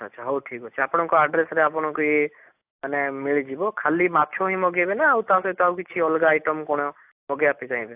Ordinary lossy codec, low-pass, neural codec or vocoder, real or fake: AAC, 32 kbps; 3.6 kHz; none; real